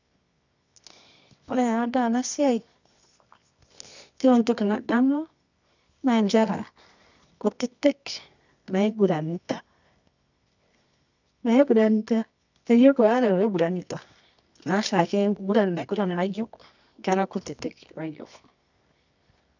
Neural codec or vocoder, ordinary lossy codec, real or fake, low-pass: codec, 24 kHz, 0.9 kbps, WavTokenizer, medium music audio release; none; fake; 7.2 kHz